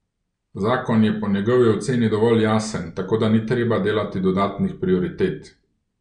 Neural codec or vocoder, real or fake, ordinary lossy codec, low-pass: none; real; none; 9.9 kHz